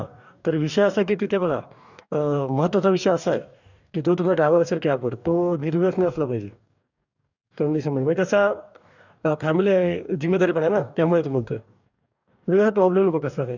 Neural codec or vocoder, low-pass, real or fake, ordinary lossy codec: codec, 44.1 kHz, 2.6 kbps, DAC; 7.2 kHz; fake; none